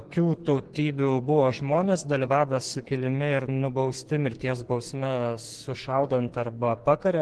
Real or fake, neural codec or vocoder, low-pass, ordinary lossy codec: fake; codec, 44.1 kHz, 2.6 kbps, SNAC; 10.8 kHz; Opus, 16 kbps